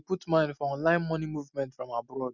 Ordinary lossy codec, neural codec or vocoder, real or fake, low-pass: none; none; real; 7.2 kHz